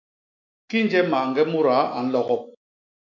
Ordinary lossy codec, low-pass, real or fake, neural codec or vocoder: MP3, 48 kbps; 7.2 kHz; fake; autoencoder, 48 kHz, 128 numbers a frame, DAC-VAE, trained on Japanese speech